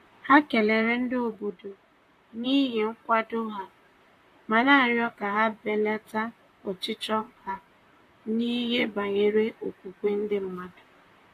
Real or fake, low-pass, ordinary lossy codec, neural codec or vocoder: fake; 14.4 kHz; AAC, 64 kbps; vocoder, 44.1 kHz, 128 mel bands, Pupu-Vocoder